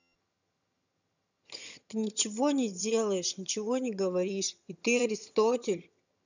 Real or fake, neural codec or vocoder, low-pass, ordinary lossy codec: fake; vocoder, 22.05 kHz, 80 mel bands, HiFi-GAN; 7.2 kHz; none